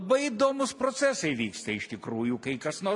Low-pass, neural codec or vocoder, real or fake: 10.8 kHz; none; real